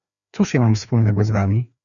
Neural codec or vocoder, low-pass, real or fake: codec, 16 kHz, 2 kbps, FreqCodec, larger model; 7.2 kHz; fake